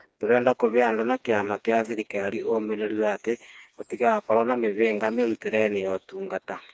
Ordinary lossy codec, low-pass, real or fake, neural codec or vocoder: none; none; fake; codec, 16 kHz, 2 kbps, FreqCodec, smaller model